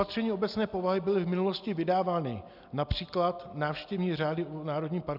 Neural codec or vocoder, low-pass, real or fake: none; 5.4 kHz; real